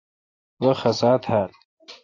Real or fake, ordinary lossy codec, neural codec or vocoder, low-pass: real; AAC, 32 kbps; none; 7.2 kHz